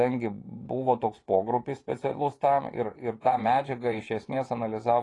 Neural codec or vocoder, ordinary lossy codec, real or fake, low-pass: vocoder, 24 kHz, 100 mel bands, Vocos; AAC, 32 kbps; fake; 10.8 kHz